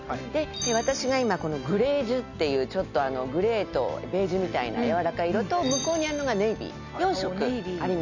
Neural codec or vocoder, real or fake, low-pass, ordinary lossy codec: none; real; 7.2 kHz; none